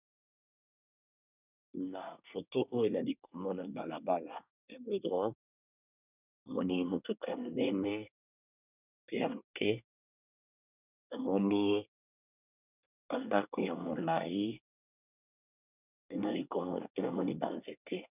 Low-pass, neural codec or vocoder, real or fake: 3.6 kHz; codec, 24 kHz, 1 kbps, SNAC; fake